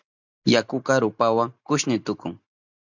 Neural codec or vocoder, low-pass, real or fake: none; 7.2 kHz; real